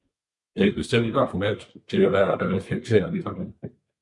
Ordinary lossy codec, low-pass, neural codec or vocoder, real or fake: MP3, 96 kbps; 10.8 kHz; codec, 24 kHz, 1 kbps, SNAC; fake